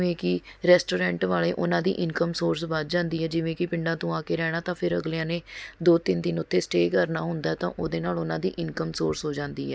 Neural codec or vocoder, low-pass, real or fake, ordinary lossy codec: none; none; real; none